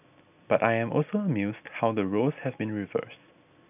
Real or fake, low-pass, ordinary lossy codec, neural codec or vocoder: real; 3.6 kHz; none; none